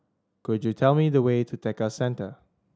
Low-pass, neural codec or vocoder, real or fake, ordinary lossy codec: none; none; real; none